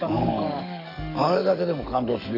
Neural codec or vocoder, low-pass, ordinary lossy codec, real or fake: codec, 44.1 kHz, 7.8 kbps, DAC; 5.4 kHz; none; fake